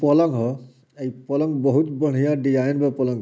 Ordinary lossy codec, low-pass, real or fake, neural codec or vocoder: none; none; real; none